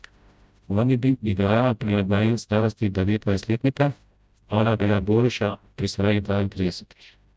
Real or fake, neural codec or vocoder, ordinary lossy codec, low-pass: fake; codec, 16 kHz, 0.5 kbps, FreqCodec, smaller model; none; none